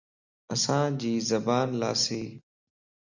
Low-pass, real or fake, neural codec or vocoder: 7.2 kHz; real; none